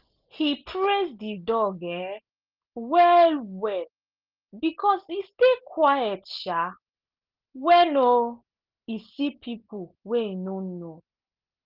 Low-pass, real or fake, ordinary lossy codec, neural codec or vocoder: 5.4 kHz; real; Opus, 32 kbps; none